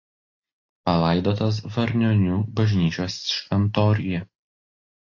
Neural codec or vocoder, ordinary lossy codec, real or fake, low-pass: none; AAC, 48 kbps; real; 7.2 kHz